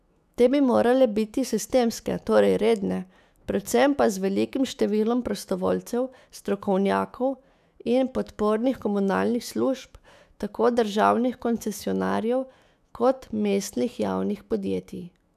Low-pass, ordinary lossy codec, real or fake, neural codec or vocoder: 14.4 kHz; none; fake; autoencoder, 48 kHz, 128 numbers a frame, DAC-VAE, trained on Japanese speech